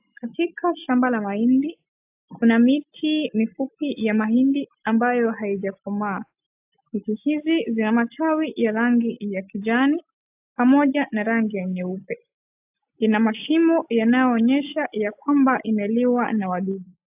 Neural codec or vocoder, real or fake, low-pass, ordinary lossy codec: none; real; 3.6 kHz; AAC, 32 kbps